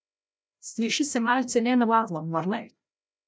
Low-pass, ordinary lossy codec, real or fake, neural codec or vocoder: none; none; fake; codec, 16 kHz, 0.5 kbps, FreqCodec, larger model